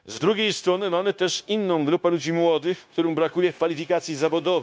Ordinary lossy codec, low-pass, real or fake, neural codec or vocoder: none; none; fake; codec, 16 kHz, 0.9 kbps, LongCat-Audio-Codec